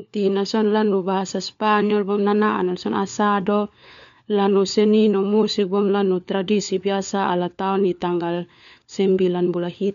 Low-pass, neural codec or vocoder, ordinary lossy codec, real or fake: 7.2 kHz; codec, 16 kHz, 4 kbps, FunCodec, trained on LibriTTS, 50 frames a second; none; fake